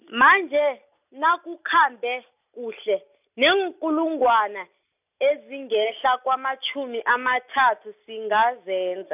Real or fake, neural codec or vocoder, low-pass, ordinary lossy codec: real; none; 3.6 kHz; none